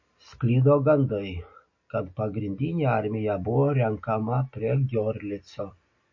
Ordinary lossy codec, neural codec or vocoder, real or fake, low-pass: MP3, 32 kbps; none; real; 7.2 kHz